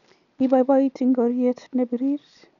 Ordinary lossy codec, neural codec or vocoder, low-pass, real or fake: none; none; 7.2 kHz; real